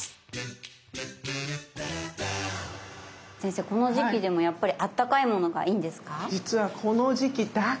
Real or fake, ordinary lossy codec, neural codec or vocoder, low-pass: real; none; none; none